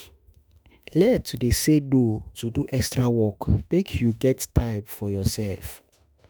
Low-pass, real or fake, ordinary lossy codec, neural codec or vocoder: none; fake; none; autoencoder, 48 kHz, 32 numbers a frame, DAC-VAE, trained on Japanese speech